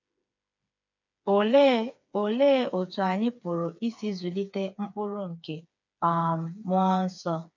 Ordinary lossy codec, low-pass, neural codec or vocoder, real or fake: none; 7.2 kHz; codec, 16 kHz, 4 kbps, FreqCodec, smaller model; fake